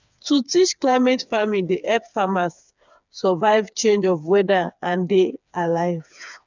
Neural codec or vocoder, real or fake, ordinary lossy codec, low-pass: codec, 16 kHz, 4 kbps, FreqCodec, smaller model; fake; none; 7.2 kHz